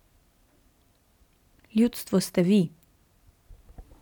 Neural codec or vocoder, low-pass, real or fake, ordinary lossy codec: none; 19.8 kHz; real; none